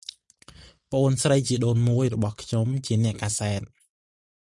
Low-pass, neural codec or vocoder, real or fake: 10.8 kHz; none; real